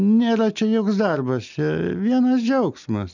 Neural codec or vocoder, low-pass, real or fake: none; 7.2 kHz; real